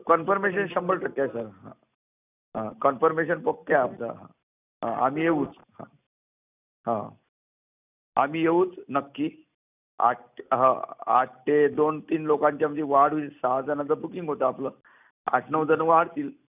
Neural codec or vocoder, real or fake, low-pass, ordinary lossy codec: none; real; 3.6 kHz; none